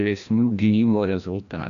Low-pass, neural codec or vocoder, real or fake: 7.2 kHz; codec, 16 kHz, 1 kbps, FreqCodec, larger model; fake